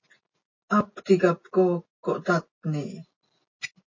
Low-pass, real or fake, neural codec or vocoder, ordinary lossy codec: 7.2 kHz; real; none; MP3, 32 kbps